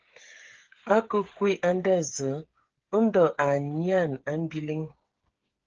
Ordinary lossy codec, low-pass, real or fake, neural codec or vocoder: Opus, 16 kbps; 7.2 kHz; fake; codec, 16 kHz, 8 kbps, FreqCodec, smaller model